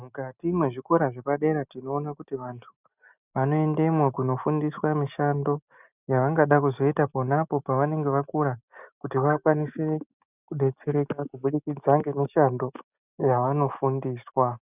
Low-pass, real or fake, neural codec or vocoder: 3.6 kHz; real; none